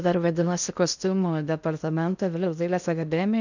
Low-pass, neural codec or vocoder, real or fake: 7.2 kHz; codec, 16 kHz in and 24 kHz out, 0.6 kbps, FocalCodec, streaming, 2048 codes; fake